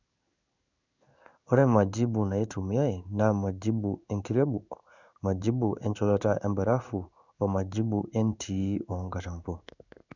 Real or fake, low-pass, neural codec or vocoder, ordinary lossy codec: fake; 7.2 kHz; codec, 16 kHz in and 24 kHz out, 1 kbps, XY-Tokenizer; none